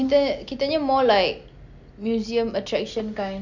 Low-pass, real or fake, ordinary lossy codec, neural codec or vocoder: 7.2 kHz; real; none; none